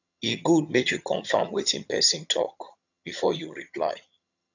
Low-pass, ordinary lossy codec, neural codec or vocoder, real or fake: 7.2 kHz; none; vocoder, 22.05 kHz, 80 mel bands, HiFi-GAN; fake